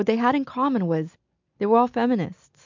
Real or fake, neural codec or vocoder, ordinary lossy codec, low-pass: real; none; MP3, 64 kbps; 7.2 kHz